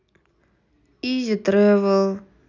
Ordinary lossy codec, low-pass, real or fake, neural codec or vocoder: none; 7.2 kHz; real; none